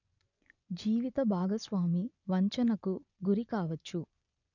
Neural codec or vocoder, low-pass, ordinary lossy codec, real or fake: vocoder, 24 kHz, 100 mel bands, Vocos; 7.2 kHz; none; fake